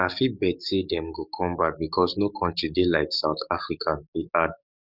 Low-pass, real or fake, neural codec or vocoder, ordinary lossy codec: 5.4 kHz; fake; codec, 44.1 kHz, 7.8 kbps, DAC; none